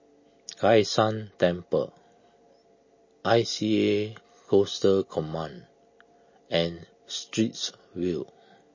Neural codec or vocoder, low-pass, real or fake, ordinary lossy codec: none; 7.2 kHz; real; MP3, 32 kbps